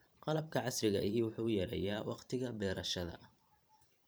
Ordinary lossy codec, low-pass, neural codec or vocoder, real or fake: none; none; vocoder, 44.1 kHz, 128 mel bands every 512 samples, BigVGAN v2; fake